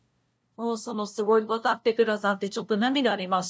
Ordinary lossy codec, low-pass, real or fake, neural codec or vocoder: none; none; fake; codec, 16 kHz, 0.5 kbps, FunCodec, trained on LibriTTS, 25 frames a second